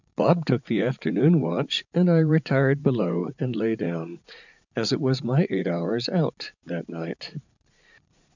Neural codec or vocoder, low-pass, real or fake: vocoder, 44.1 kHz, 80 mel bands, Vocos; 7.2 kHz; fake